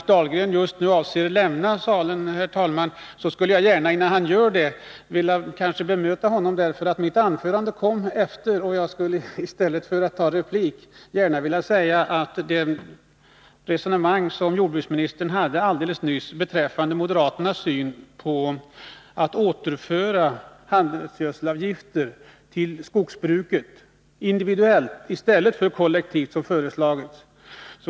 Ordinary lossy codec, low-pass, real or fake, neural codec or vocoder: none; none; real; none